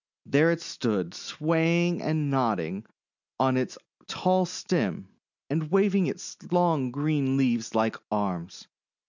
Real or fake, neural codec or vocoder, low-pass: real; none; 7.2 kHz